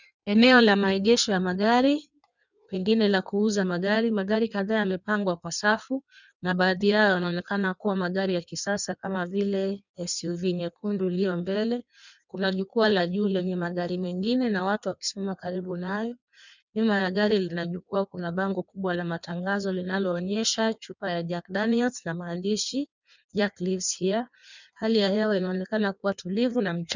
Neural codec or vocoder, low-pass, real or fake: codec, 16 kHz in and 24 kHz out, 1.1 kbps, FireRedTTS-2 codec; 7.2 kHz; fake